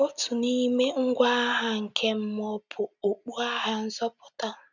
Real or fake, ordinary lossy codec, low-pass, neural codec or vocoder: real; none; 7.2 kHz; none